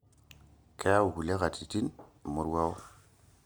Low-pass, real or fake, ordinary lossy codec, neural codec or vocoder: none; real; none; none